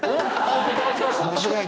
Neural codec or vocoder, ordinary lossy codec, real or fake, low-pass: codec, 16 kHz, 2 kbps, X-Codec, HuBERT features, trained on general audio; none; fake; none